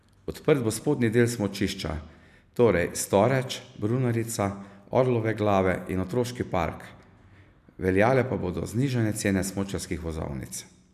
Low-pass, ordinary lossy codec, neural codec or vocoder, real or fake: 14.4 kHz; none; none; real